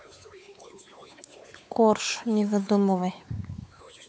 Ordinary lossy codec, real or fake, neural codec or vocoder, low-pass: none; fake; codec, 16 kHz, 4 kbps, X-Codec, WavLM features, trained on Multilingual LibriSpeech; none